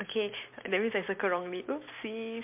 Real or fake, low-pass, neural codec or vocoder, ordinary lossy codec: real; 3.6 kHz; none; MP3, 32 kbps